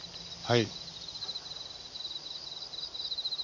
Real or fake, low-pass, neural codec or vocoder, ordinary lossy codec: real; 7.2 kHz; none; none